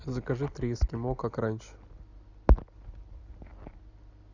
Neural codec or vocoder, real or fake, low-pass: none; real; 7.2 kHz